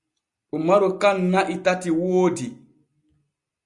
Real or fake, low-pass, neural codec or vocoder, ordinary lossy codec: real; 10.8 kHz; none; Opus, 64 kbps